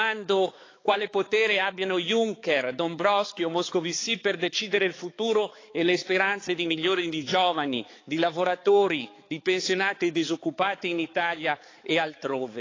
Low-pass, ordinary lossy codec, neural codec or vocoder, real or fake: 7.2 kHz; AAC, 32 kbps; codec, 16 kHz, 4 kbps, X-Codec, HuBERT features, trained on balanced general audio; fake